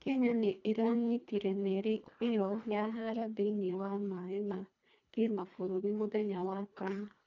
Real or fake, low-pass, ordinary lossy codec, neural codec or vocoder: fake; 7.2 kHz; none; codec, 24 kHz, 1.5 kbps, HILCodec